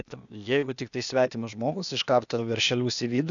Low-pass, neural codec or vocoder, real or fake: 7.2 kHz; codec, 16 kHz, 0.8 kbps, ZipCodec; fake